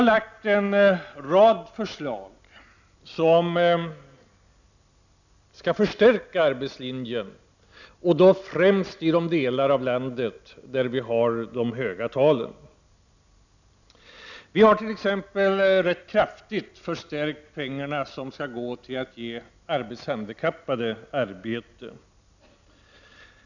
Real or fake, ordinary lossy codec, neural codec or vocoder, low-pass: real; none; none; 7.2 kHz